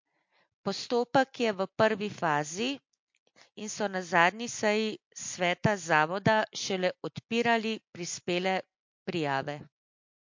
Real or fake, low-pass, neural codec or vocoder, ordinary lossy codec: real; 7.2 kHz; none; MP3, 48 kbps